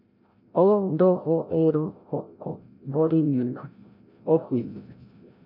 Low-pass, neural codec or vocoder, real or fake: 5.4 kHz; codec, 16 kHz, 0.5 kbps, FreqCodec, larger model; fake